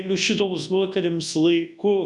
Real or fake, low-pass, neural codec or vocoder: fake; 10.8 kHz; codec, 24 kHz, 0.9 kbps, WavTokenizer, large speech release